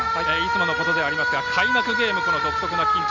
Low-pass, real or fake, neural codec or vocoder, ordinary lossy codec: 7.2 kHz; real; none; none